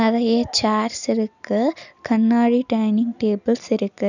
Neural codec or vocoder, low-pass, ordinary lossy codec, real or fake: none; 7.2 kHz; none; real